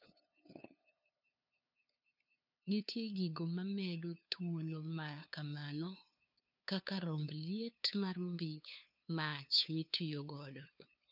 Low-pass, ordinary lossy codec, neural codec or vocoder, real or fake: 5.4 kHz; none; codec, 16 kHz, 2 kbps, FunCodec, trained on LibriTTS, 25 frames a second; fake